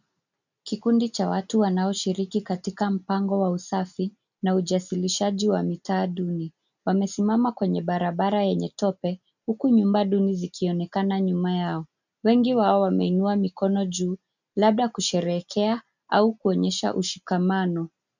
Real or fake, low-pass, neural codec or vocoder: real; 7.2 kHz; none